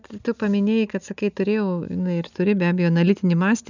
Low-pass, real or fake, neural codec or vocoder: 7.2 kHz; real; none